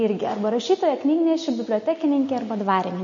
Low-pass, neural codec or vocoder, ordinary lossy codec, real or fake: 7.2 kHz; none; MP3, 32 kbps; real